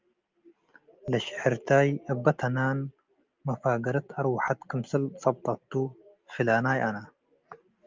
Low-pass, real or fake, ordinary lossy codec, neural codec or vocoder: 7.2 kHz; real; Opus, 24 kbps; none